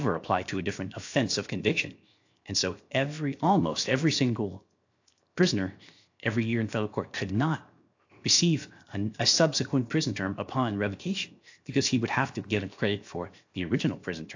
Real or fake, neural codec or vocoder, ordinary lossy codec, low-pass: fake; codec, 16 kHz, 0.7 kbps, FocalCodec; AAC, 48 kbps; 7.2 kHz